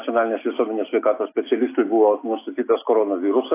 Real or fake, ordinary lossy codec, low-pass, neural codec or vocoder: real; AAC, 24 kbps; 3.6 kHz; none